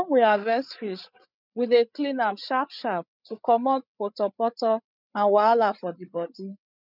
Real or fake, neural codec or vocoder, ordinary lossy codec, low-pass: fake; codec, 16 kHz, 8 kbps, FreqCodec, larger model; none; 5.4 kHz